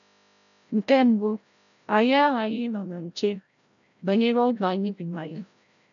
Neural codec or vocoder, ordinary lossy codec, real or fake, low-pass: codec, 16 kHz, 0.5 kbps, FreqCodec, larger model; none; fake; 7.2 kHz